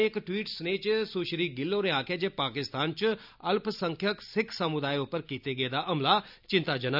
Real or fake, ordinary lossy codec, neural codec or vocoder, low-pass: real; none; none; 5.4 kHz